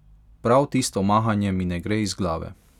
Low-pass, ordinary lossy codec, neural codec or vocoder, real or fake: 19.8 kHz; none; none; real